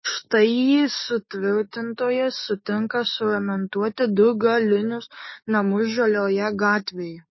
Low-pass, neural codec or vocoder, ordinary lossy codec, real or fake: 7.2 kHz; codec, 16 kHz, 6 kbps, DAC; MP3, 24 kbps; fake